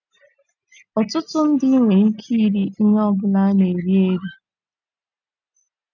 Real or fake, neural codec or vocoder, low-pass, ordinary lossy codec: real; none; 7.2 kHz; none